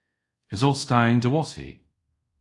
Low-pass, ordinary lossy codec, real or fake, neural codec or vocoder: 10.8 kHz; AAC, 48 kbps; fake; codec, 24 kHz, 0.5 kbps, DualCodec